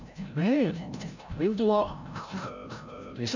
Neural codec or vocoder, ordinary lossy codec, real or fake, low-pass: codec, 16 kHz, 0.5 kbps, FreqCodec, larger model; none; fake; 7.2 kHz